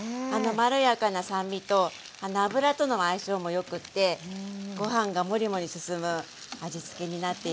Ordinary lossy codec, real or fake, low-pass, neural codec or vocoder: none; real; none; none